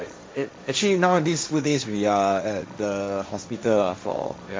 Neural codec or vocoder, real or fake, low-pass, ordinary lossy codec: codec, 16 kHz, 1.1 kbps, Voila-Tokenizer; fake; none; none